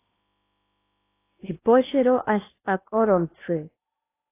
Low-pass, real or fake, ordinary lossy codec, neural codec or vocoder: 3.6 kHz; fake; AAC, 24 kbps; codec, 16 kHz in and 24 kHz out, 0.8 kbps, FocalCodec, streaming, 65536 codes